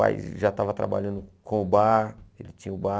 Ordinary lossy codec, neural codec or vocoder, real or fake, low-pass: none; none; real; none